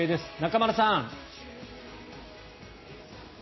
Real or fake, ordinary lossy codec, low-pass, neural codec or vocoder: real; MP3, 24 kbps; 7.2 kHz; none